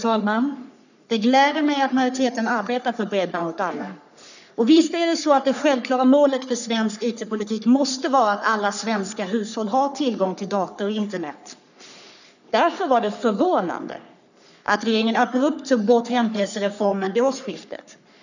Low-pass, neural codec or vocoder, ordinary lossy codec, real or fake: 7.2 kHz; codec, 44.1 kHz, 3.4 kbps, Pupu-Codec; none; fake